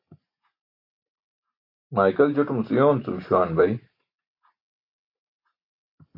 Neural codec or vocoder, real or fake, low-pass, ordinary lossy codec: none; real; 5.4 kHz; AAC, 32 kbps